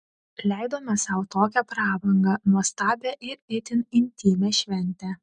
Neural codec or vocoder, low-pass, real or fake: none; 9.9 kHz; real